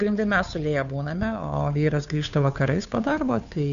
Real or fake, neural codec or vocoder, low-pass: fake; codec, 16 kHz, 2 kbps, FunCodec, trained on Chinese and English, 25 frames a second; 7.2 kHz